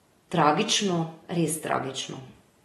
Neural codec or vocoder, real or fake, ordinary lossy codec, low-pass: none; real; AAC, 32 kbps; 19.8 kHz